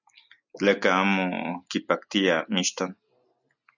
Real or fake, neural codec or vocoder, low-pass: real; none; 7.2 kHz